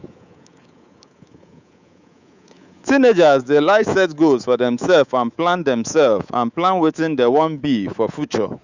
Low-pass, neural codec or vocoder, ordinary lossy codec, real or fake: 7.2 kHz; codec, 24 kHz, 3.1 kbps, DualCodec; Opus, 64 kbps; fake